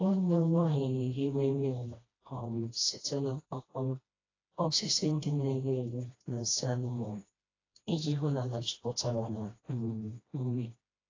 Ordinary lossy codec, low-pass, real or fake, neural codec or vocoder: AAC, 32 kbps; 7.2 kHz; fake; codec, 16 kHz, 1 kbps, FreqCodec, smaller model